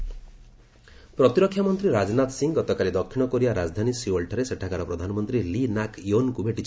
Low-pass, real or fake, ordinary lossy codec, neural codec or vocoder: none; real; none; none